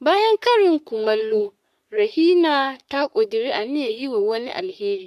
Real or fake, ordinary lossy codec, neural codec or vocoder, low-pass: fake; MP3, 96 kbps; codec, 44.1 kHz, 3.4 kbps, Pupu-Codec; 14.4 kHz